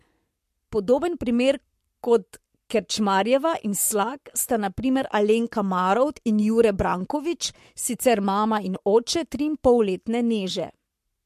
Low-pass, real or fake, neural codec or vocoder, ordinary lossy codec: 14.4 kHz; fake; codec, 44.1 kHz, 7.8 kbps, Pupu-Codec; MP3, 64 kbps